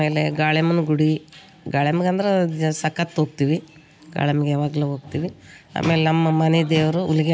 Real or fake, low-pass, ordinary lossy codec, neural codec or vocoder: real; none; none; none